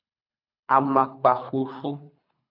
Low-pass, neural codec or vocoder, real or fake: 5.4 kHz; codec, 24 kHz, 3 kbps, HILCodec; fake